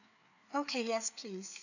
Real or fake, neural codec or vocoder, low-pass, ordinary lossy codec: fake; codec, 16 kHz, 4 kbps, FreqCodec, larger model; 7.2 kHz; none